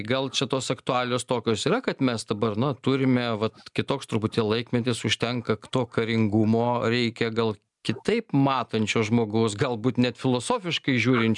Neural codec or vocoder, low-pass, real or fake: none; 10.8 kHz; real